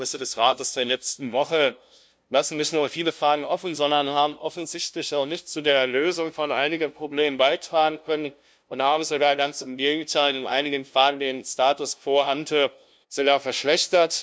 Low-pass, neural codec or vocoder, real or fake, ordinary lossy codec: none; codec, 16 kHz, 0.5 kbps, FunCodec, trained on LibriTTS, 25 frames a second; fake; none